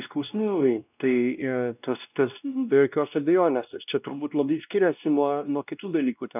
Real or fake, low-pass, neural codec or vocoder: fake; 3.6 kHz; codec, 16 kHz, 1 kbps, X-Codec, WavLM features, trained on Multilingual LibriSpeech